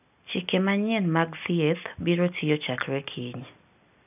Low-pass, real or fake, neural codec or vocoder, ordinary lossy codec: 3.6 kHz; real; none; none